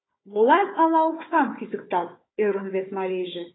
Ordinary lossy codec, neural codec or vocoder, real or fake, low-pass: AAC, 16 kbps; codec, 16 kHz, 4 kbps, FunCodec, trained on Chinese and English, 50 frames a second; fake; 7.2 kHz